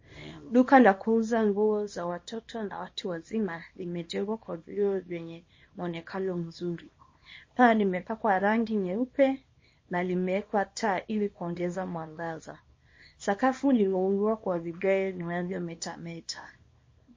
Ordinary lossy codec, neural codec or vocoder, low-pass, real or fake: MP3, 32 kbps; codec, 24 kHz, 0.9 kbps, WavTokenizer, small release; 7.2 kHz; fake